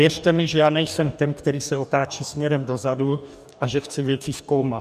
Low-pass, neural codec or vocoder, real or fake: 14.4 kHz; codec, 44.1 kHz, 2.6 kbps, DAC; fake